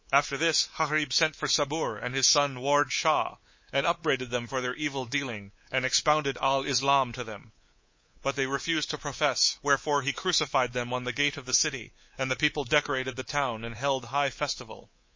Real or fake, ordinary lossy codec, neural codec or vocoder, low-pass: fake; MP3, 32 kbps; codec, 24 kHz, 3.1 kbps, DualCodec; 7.2 kHz